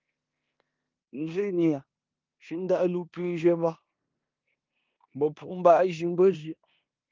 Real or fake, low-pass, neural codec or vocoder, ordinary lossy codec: fake; 7.2 kHz; codec, 16 kHz in and 24 kHz out, 0.9 kbps, LongCat-Audio-Codec, four codebook decoder; Opus, 32 kbps